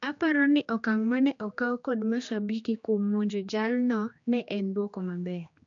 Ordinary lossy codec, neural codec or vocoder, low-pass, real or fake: AAC, 64 kbps; codec, 16 kHz, 2 kbps, X-Codec, HuBERT features, trained on general audio; 7.2 kHz; fake